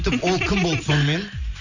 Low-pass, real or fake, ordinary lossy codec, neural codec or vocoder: 7.2 kHz; real; none; none